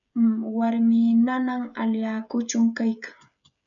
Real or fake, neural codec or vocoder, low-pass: fake; codec, 16 kHz, 16 kbps, FreqCodec, smaller model; 7.2 kHz